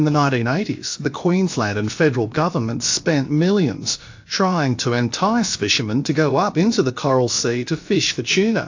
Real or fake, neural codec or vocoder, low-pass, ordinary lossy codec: fake; codec, 16 kHz, about 1 kbps, DyCAST, with the encoder's durations; 7.2 kHz; AAC, 48 kbps